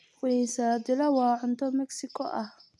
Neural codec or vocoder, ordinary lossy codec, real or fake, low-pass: none; none; real; none